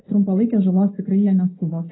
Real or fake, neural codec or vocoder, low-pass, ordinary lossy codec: real; none; 7.2 kHz; AAC, 16 kbps